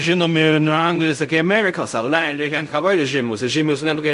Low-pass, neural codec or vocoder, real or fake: 10.8 kHz; codec, 16 kHz in and 24 kHz out, 0.4 kbps, LongCat-Audio-Codec, fine tuned four codebook decoder; fake